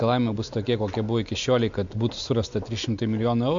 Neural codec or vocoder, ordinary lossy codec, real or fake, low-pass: none; MP3, 64 kbps; real; 7.2 kHz